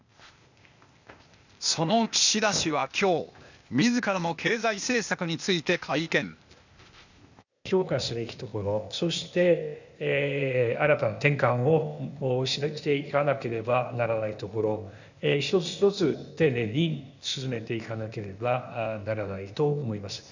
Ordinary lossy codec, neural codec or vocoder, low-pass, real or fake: none; codec, 16 kHz, 0.8 kbps, ZipCodec; 7.2 kHz; fake